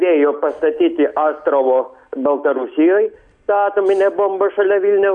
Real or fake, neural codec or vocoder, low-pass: real; none; 9.9 kHz